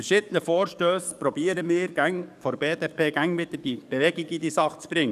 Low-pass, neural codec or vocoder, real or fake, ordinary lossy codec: 14.4 kHz; codec, 44.1 kHz, 7.8 kbps, DAC; fake; none